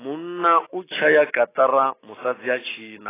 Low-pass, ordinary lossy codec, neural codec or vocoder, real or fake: 3.6 kHz; AAC, 16 kbps; none; real